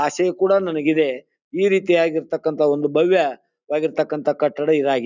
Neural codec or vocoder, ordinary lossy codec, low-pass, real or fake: none; none; 7.2 kHz; real